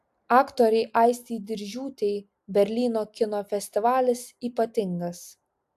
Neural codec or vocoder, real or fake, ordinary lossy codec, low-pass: none; real; AAC, 96 kbps; 14.4 kHz